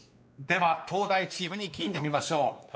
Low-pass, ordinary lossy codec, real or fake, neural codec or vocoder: none; none; fake; codec, 16 kHz, 2 kbps, X-Codec, WavLM features, trained on Multilingual LibriSpeech